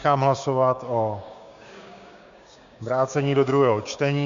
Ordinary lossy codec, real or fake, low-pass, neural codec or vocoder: MP3, 64 kbps; fake; 7.2 kHz; codec, 16 kHz, 6 kbps, DAC